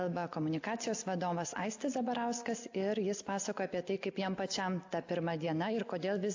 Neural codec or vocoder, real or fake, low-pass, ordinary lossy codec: none; real; 7.2 kHz; AAC, 48 kbps